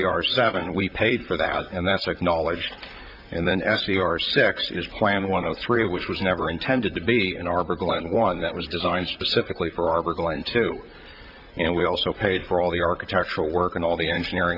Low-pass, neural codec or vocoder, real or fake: 5.4 kHz; vocoder, 44.1 kHz, 128 mel bands, Pupu-Vocoder; fake